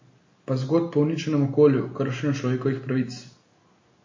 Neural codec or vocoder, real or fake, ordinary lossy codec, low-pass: none; real; MP3, 32 kbps; 7.2 kHz